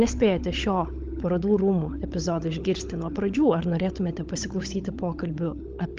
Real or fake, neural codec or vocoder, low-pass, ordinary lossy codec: fake; codec, 16 kHz, 16 kbps, FunCodec, trained on LibriTTS, 50 frames a second; 7.2 kHz; Opus, 32 kbps